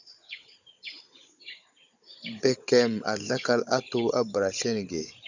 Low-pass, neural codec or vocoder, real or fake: 7.2 kHz; codec, 16 kHz, 16 kbps, FunCodec, trained on Chinese and English, 50 frames a second; fake